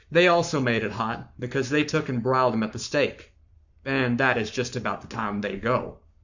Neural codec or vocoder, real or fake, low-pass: codec, 44.1 kHz, 7.8 kbps, Pupu-Codec; fake; 7.2 kHz